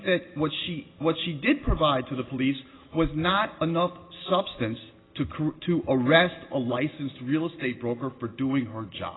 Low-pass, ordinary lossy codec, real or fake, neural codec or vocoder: 7.2 kHz; AAC, 16 kbps; real; none